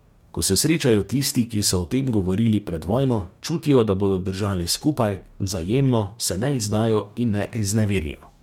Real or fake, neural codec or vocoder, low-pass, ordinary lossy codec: fake; codec, 44.1 kHz, 2.6 kbps, DAC; 19.8 kHz; MP3, 96 kbps